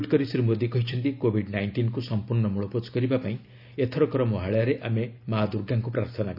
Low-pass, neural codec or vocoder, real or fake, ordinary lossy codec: 5.4 kHz; none; real; none